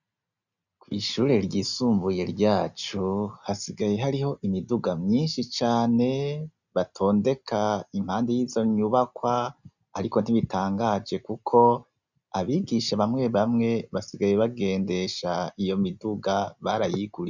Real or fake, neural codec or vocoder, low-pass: real; none; 7.2 kHz